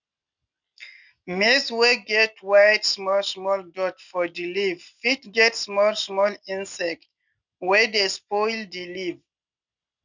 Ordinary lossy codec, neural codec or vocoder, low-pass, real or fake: none; none; 7.2 kHz; real